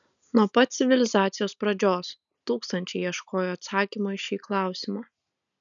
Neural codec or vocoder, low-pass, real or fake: none; 7.2 kHz; real